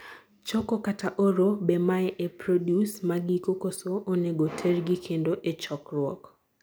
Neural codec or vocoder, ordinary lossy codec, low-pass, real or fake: none; none; none; real